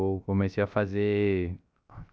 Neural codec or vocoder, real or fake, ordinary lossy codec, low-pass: codec, 16 kHz, 0.3 kbps, FocalCodec; fake; none; none